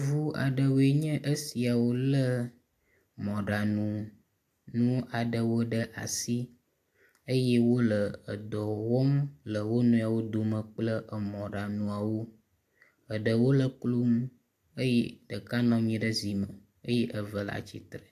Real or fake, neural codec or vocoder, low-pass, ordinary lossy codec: real; none; 14.4 kHz; AAC, 64 kbps